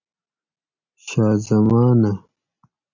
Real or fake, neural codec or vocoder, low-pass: real; none; 7.2 kHz